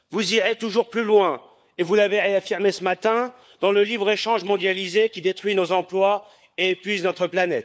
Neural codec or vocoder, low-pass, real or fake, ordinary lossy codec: codec, 16 kHz, 4 kbps, FunCodec, trained on LibriTTS, 50 frames a second; none; fake; none